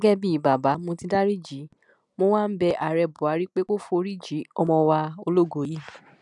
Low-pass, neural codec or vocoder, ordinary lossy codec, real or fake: 10.8 kHz; none; none; real